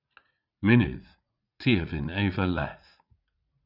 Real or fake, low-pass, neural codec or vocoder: fake; 5.4 kHz; vocoder, 44.1 kHz, 80 mel bands, Vocos